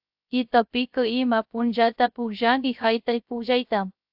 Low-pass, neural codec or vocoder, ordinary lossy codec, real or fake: 5.4 kHz; codec, 16 kHz, 0.3 kbps, FocalCodec; AAC, 48 kbps; fake